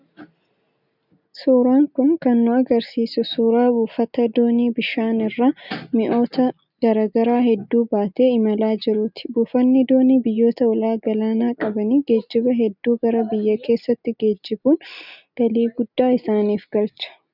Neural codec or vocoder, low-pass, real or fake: none; 5.4 kHz; real